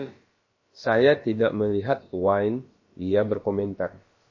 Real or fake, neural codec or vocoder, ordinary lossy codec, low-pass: fake; codec, 16 kHz, about 1 kbps, DyCAST, with the encoder's durations; MP3, 32 kbps; 7.2 kHz